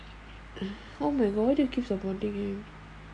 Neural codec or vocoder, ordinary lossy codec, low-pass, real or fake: none; none; 10.8 kHz; real